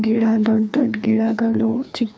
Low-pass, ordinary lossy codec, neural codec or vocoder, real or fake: none; none; codec, 16 kHz, 2 kbps, FreqCodec, larger model; fake